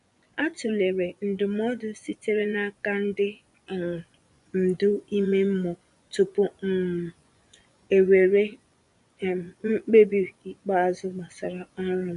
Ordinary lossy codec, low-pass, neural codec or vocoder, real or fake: none; 10.8 kHz; vocoder, 24 kHz, 100 mel bands, Vocos; fake